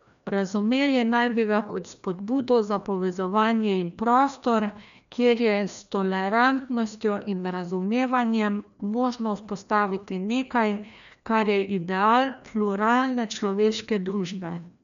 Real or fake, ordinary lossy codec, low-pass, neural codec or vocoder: fake; none; 7.2 kHz; codec, 16 kHz, 1 kbps, FreqCodec, larger model